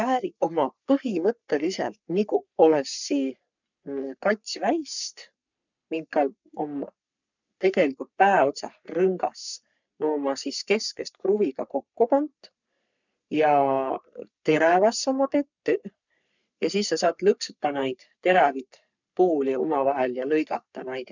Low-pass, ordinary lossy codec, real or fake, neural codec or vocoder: 7.2 kHz; none; fake; codec, 44.1 kHz, 3.4 kbps, Pupu-Codec